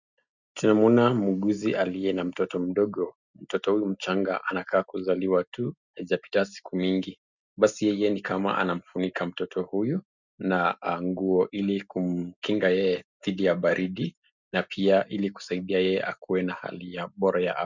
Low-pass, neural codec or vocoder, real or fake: 7.2 kHz; none; real